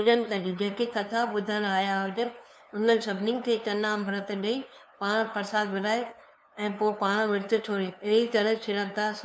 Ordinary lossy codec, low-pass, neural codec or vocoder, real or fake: none; none; codec, 16 kHz, 2 kbps, FunCodec, trained on LibriTTS, 25 frames a second; fake